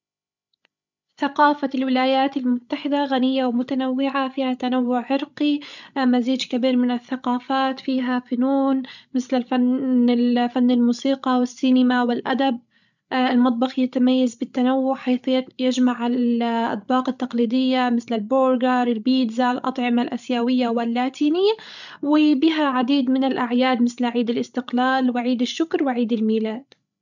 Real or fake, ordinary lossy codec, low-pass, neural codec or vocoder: fake; none; 7.2 kHz; codec, 16 kHz, 16 kbps, FreqCodec, larger model